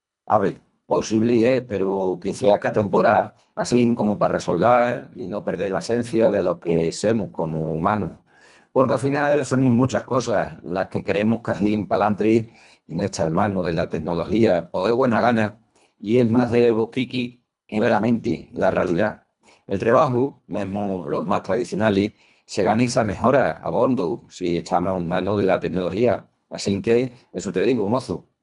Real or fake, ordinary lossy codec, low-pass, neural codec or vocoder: fake; none; 10.8 kHz; codec, 24 kHz, 1.5 kbps, HILCodec